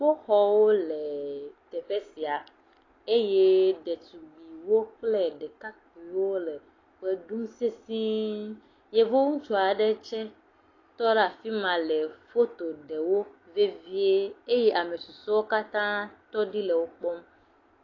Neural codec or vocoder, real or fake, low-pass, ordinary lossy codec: none; real; 7.2 kHz; AAC, 32 kbps